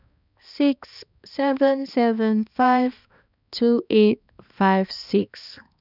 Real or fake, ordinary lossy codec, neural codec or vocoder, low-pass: fake; none; codec, 16 kHz, 2 kbps, X-Codec, HuBERT features, trained on balanced general audio; 5.4 kHz